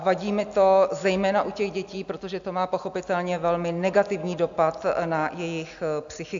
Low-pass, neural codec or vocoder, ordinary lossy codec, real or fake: 7.2 kHz; none; AAC, 64 kbps; real